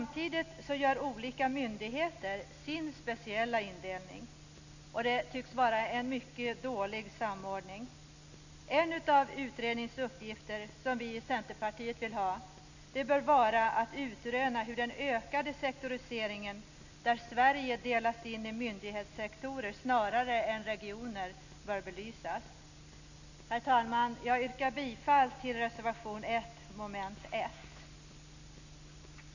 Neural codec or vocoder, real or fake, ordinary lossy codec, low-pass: none; real; none; 7.2 kHz